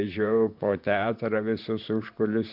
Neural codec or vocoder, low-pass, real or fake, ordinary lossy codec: vocoder, 44.1 kHz, 128 mel bands, Pupu-Vocoder; 5.4 kHz; fake; MP3, 48 kbps